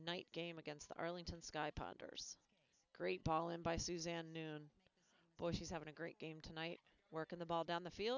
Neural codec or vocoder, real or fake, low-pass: none; real; 7.2 kHz